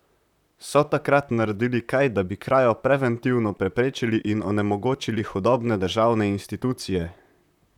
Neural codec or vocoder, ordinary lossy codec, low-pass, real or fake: vocoder, 44.1 kHz, 128 mel bands, Pupu-Vocoder; none; 19.8 kHz; fake